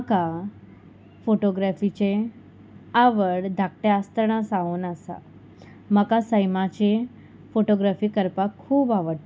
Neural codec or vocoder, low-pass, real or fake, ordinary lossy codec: none; none; real; none